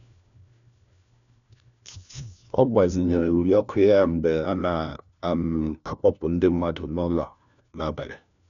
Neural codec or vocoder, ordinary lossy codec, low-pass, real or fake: codec, 16 kHz, 1 kbps, FunCodec, trained on LibriTTS, 50 frames a second; none; 7.2 kHz; fake